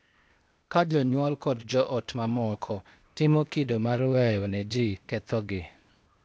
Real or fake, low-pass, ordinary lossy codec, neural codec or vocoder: fake; none; none; codec, 16 kHz, 0.8 kbps, ZipCodec